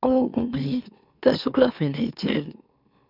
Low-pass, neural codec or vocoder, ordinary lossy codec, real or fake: 5.4 kHz; autoencoder, 44.1 kHz, a latent of 192 numbers a frame, MeloTTS; none; fake